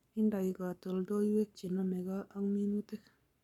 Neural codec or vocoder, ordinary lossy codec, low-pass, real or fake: codec, 44.1 kHz, 7.8 kbps, Pupu-Codec; none; 19.8 kHz; fake